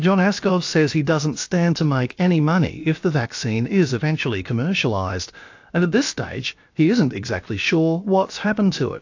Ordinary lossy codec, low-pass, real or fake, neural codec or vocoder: AAC, 48 kbps; 7.2 kHz; fake; codec, 16 kHz, about 1 kbps, DyCAST, with the encoder's durations